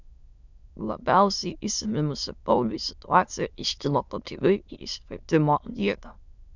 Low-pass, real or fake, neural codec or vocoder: 7.2 kHz; fake; autoencoder, 22.05 kHz, a latent of 192 numbers a frame, VITS, trained on many speakers